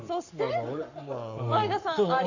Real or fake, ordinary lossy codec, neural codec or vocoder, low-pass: fake; none; codec, 44.1 kHz, 7.8 kbps, Pupu-Codec; 7.2 kHz